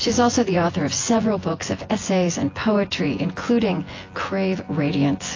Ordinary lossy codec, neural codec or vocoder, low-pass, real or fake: AAC, 32 kbps; vocoder, 24 kHz, 100 mel bands, Vocos; 7.2 kHz; fake